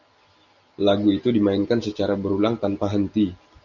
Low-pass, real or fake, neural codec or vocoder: 7.2 kHz; real; none